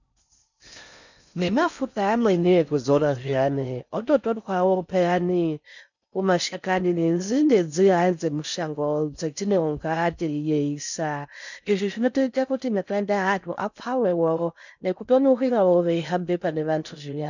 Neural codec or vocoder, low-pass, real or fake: codec, 16 kHz in and 24 kHz out, 0.6 kbps, FocalCodec, streaming, 4096 codes; 7.2 kHz; fake